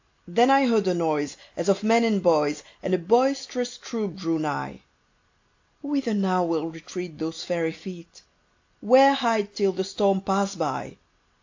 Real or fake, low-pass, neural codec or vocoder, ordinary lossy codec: real; 7.2 kHz; none; AAC, 48 kbps